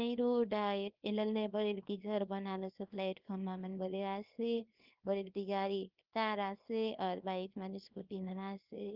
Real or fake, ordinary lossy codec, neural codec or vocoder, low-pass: fake; Opus, 32 kbps; codec, 24 kHz, 0.9 kbps, WavTokenizer, small release; 5.4 kHz